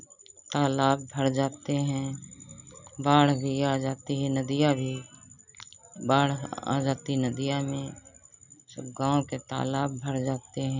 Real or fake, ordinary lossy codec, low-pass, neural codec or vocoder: real; none; 7.2 kHz; none